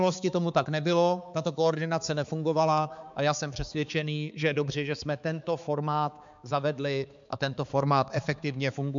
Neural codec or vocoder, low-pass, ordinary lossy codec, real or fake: codec, 16 kHz, 4 kbps, X-Codec, HuBERT features, trained on balanced general audio; 7.2 kHz; MP3, 64 kbps; fake